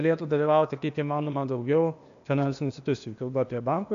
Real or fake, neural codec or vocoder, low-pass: fake; codec, 16 kHz, 0.8 kbps, ZipCodec; 7.2 kHz